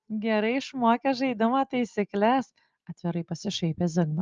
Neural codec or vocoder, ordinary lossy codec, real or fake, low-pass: none; Opus, 24 kbps; real; 7.2 kHz